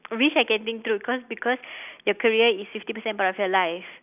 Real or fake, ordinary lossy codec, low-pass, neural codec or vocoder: real; none; 3.6 kHz; none